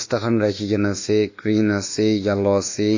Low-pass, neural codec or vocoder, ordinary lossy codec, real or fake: 7.2 kHz; codec, 24 kHz, 1.2 kbps, DualCodec; AAC, 48 kbps; fake